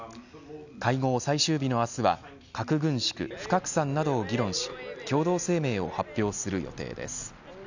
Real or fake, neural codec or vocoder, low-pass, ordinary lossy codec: real; none; 7.2 kHz; none